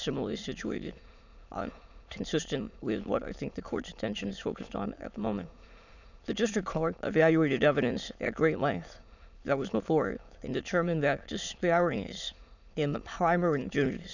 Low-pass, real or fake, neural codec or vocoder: 7.2 kHz; fake; autoencoder, 22.05 kHz, a latent of 192 numbers a frame, VITS, trained on many speakers